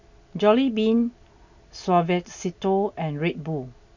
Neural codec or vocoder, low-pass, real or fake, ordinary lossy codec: none; 7.2 kHz; real; none